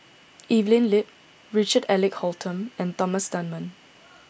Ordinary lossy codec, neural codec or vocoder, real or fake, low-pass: none; none; real; none